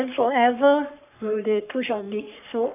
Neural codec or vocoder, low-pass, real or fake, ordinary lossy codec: codec, 44.1 kHz, 3.4 kbps, Pupu-Codec; 3.6 kHz; fake; none